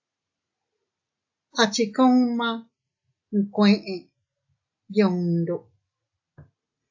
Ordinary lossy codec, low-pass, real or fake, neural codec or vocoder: AAC, 48 kbps; 7.2 kHz; real; none